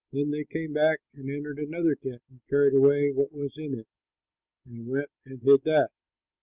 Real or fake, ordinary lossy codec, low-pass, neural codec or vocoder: real; Opus, 32 kbps; 3.6 kHz; none